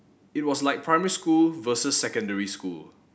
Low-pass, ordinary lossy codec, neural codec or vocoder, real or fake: none; none; none; real